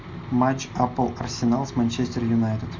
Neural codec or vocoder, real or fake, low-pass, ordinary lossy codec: none; real; 7.2 kHz; MP3, 64 kbps